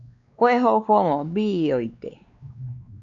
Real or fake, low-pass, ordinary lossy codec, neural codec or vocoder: fake; 7.2 kHz; MP3, 96 kbps; codec, 16 kHz, 2 kbps, X-Codec, WavLM features, trained on Multilingual LibriSpeech